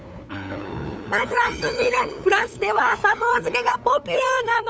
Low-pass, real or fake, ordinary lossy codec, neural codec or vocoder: none; fake; none; codec, 16 kHz, 8 kbps, FunCodec, trained on LibriTTS, 25 frames a second